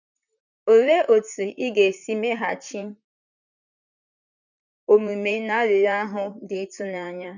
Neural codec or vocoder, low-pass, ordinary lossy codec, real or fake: vocoder, 44.1 kHz, 128 mel bands, Pupu-Vocoder; 7.2 kHz; none; fake